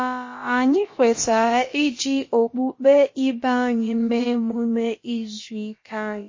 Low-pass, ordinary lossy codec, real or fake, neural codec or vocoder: 7.2 kHz; MP3, 32 kbps; fake; codec, 16 kHz, about 1 kbps, DyCAST, with the encoder's durations